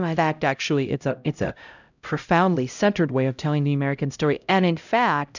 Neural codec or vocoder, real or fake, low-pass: codec, 16 kHz, 0.5 kbps, X-Codec, HuBERT features, trained on LibriSpeech; fake; 7.2 kHz